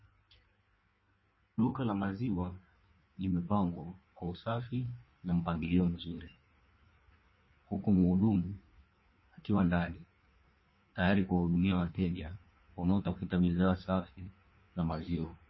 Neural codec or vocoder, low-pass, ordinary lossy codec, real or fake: codec, 16 kHz in and 24 kHz out, 1.1 kbps, FireRedTTS-2 codec; 7.2 kHz; MP3, 24 kbps; fake